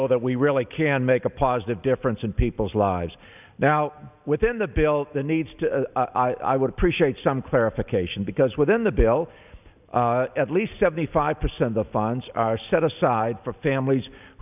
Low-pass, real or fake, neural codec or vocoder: 3.6 kHz; real; none